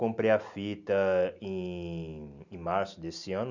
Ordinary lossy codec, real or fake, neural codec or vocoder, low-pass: none; real; none; 7.2 kHz